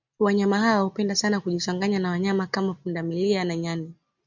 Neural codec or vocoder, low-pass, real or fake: none; 7.2 kHz; real